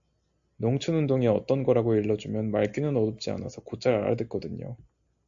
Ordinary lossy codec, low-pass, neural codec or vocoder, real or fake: AAC, 64 kbps; 7.2 kHz; none; real